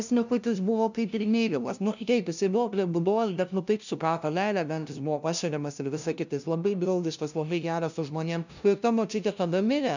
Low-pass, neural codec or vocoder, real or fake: 7.2 kHz; codec, 16 kHz, 0.5 kbps, FunCodec, trained on LibriTTS, 25 frames a second; fake